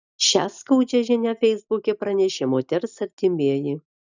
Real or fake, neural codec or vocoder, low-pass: real; none; 7.2 kHz